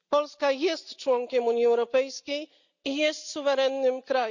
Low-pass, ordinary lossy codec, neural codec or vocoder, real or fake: 7.2 kHz; none; none; real